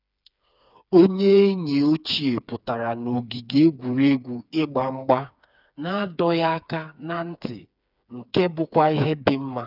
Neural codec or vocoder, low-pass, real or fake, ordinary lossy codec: codec, 16 kHz, 4 kbps, FreqCodec, smaller model; 5.4 kHz; fake; none